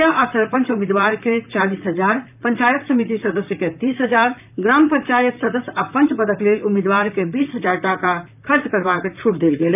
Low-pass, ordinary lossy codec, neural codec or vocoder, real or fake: 3.6 kHz; none; vocoder, 44.1 kHz, 128 mel bands, Pupu-Vocoder; fake